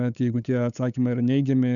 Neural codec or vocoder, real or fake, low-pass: codec, 16 kHz, 8 kbps, FunCodec, trained on LibriTTS, 25 frames a second; fake; 7.2 kHz